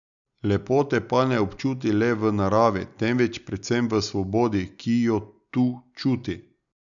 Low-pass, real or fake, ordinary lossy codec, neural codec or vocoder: 7.2 kHz; real; none; none